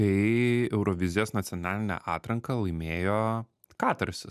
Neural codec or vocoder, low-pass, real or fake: none; 14.4 kHz; real